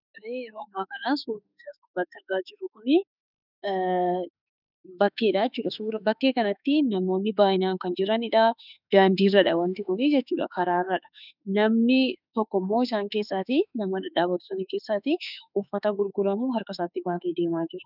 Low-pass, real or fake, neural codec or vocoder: 5.4 kHz; fake; autoencoder, 48 kHz, 32 numbers a frame, DAC-VAE, trained on Japanese speech